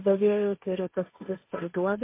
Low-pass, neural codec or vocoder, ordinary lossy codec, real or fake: 3.6 kHz; codec, 16 kHz, 1.1 kbps, Voila-Tokenizer; MP3, 24 kbps; fake